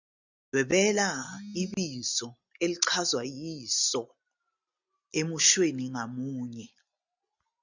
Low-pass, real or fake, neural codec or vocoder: 7.2 kHz; real; none